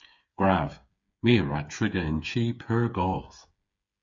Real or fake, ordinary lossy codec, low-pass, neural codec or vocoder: fake; MP3, 48 kbps; 7.2 kHz; codec, 16 kHz, 8 kbps, FreqCodec, smaller model